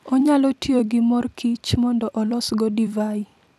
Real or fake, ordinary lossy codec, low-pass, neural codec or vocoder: fake; none; 14.4 kHz; vocoder, 44.1 kHz, 128 mel bands every 256 samples, BigVGAN v2